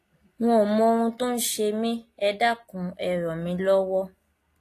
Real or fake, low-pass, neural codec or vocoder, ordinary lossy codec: real; 14.4 kHz; none; AAC, 48 kbps